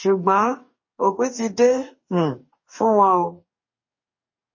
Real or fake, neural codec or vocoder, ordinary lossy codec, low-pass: fake; codec, 44.1 kHz, 2.6 kbps, DAC; MP3, 32 kbps; 7.2 kHz